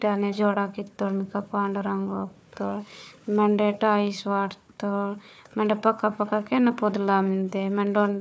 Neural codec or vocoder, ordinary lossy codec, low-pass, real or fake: codec, 16 kHz, 4 kbps, FunCodec, trained on Chinese and English, 50 frames a second; none; none; fake